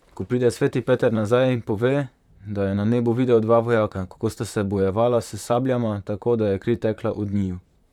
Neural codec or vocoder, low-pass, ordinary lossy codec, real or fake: vocoder, 44.1 kHz, 128 mel bands, Pupu-Vocoder; 19.8 kHz; none; fake